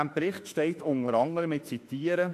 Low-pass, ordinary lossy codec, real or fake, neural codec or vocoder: 14.4 kHz; AAC, 64 kbps; fake; autoencoder, 48 kHz, 32 numbers a frame, DAC-VAE, trained on Japanese speech